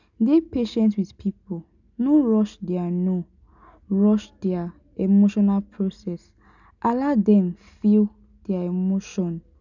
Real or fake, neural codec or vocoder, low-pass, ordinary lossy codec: real; none; 7.2 kHz; none